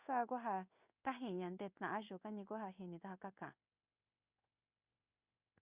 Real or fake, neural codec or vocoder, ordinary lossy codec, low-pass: fake; codec, 16 kHz in and 24 kHz out, 1 kbps, XY-Tokenizer; Opus, 64 kbps; 3.6 kHz